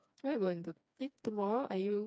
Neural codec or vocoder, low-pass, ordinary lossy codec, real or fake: codec, 16 kHz, 2 kbps, FreqCodec, smaller model; none; none; fake